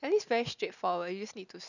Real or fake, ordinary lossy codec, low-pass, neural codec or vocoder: real; none; 7.2 kHz; none